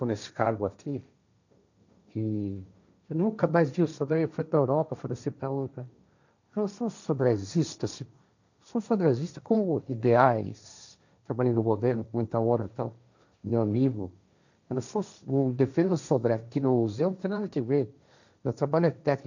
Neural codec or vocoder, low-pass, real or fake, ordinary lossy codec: codec, 16 kHz, 1.1 kbps, Voila-Tokenizer; 7.2 kHz; fake; none